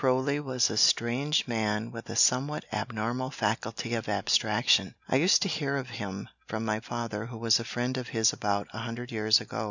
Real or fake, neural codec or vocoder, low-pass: real; none; 7.2 kHz